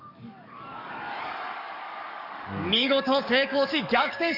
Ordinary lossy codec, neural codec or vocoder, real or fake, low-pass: none; codec, 44.1 kHz, 7.8 kbps, DAC; fake; 5.4 kHz